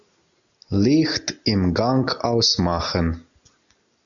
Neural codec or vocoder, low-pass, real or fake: none; 7.2 kHz; real